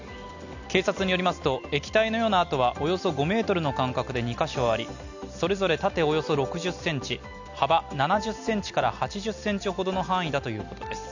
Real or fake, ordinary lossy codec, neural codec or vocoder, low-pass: real; none; none; 7.2 kHz